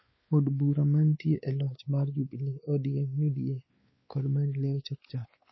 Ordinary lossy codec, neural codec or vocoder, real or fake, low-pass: MP3, 24 kbps; codec, 16 kHz, 4 kbps, X-Codec, WavLM features, trained on Multilingual LibriSpeech; fake; 7.2 kHz